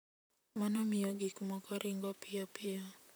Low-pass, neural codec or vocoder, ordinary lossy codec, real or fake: none; vocoder, 44.1 kHz, 128 mel bands, Pupu-Vocoder; none; fake